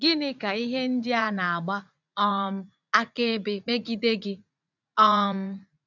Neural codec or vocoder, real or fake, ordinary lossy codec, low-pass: vocoder, 44.1 kHz, 80 mel bands, Vocos; fake; none; 7.2 kHz